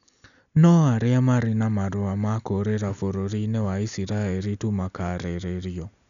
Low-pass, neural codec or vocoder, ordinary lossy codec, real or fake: 7.2 kHz; none; none; real